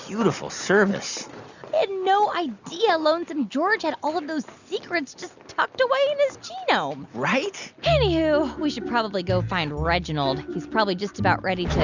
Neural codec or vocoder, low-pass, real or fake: none; 7.2 kHz; real